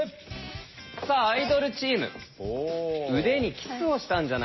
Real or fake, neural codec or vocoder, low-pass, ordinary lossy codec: real; none; 7.2 kHz; MP3, 24 kbps